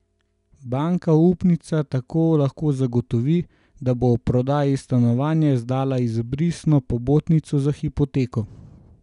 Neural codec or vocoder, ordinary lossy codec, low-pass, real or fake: none; none; 10.8 kHz; real